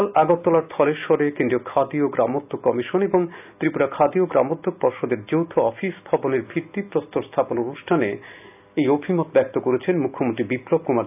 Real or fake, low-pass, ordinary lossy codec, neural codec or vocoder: real; 3.6 kHz; none; none